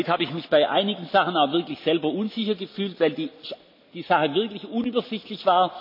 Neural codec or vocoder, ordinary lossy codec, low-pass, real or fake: none; MP3, 48 kbps; 5.4 kHz; real